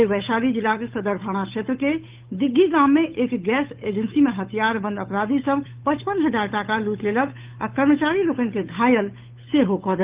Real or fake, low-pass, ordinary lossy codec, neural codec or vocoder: fake; 3.6 kHz; Opus, 32 kbps; codec, 16 kHz, 8 kbps, FunCodec, trained on Chinese and English, 25 frames a second